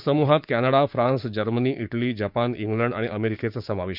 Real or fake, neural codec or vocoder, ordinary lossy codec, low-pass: fake; codec, 16 kHz, 6 kbps, DAC; none; 5.4 kHz